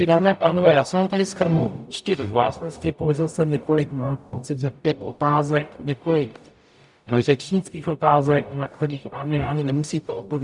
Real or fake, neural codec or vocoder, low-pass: fake; codec, 44.1 kHz, 0.9 kbps, DAC; 10.8 kHz